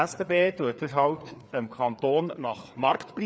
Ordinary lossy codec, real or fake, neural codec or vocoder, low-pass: none; fake; codec, 16 kHz, 4 kbps, FreqCodec, larger model; none